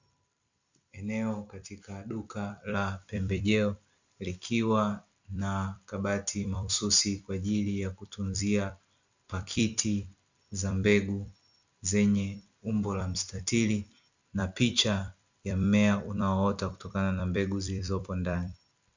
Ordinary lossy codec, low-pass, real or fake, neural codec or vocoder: Opus, 64 kbps; 7.2 kHz; fake; vocoder, 44.1 kHz, 128 mel bands every 256 samples, BigVGAN v2